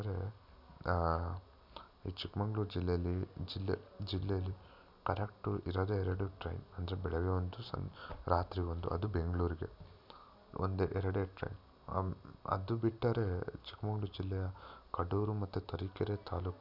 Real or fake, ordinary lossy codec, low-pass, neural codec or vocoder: real; none; 5.4 kHz; none